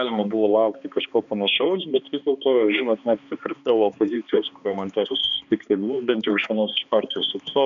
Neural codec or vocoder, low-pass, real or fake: codec, 16 kHz, 2 kbps, X-Codec, HuBERT features, trained on balanced general audio; 7.2 kHz; fake